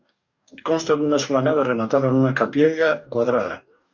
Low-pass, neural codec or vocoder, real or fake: 7.2 kHz; codec, 44.1 kHz, 2.6 kbps, DAC; fake